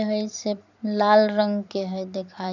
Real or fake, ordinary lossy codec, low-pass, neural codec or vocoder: fake; none; 7.2 kHz; vocoder, 44.1 kHz, 128 mel bands every 256 samples, BigVGAN v2